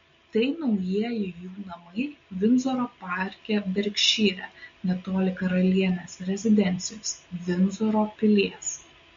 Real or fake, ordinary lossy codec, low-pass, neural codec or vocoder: real; MP3, 48 kbps; 7.2 kHz; none